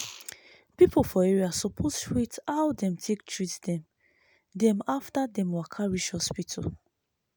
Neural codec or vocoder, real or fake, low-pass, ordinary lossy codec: none; real; none; none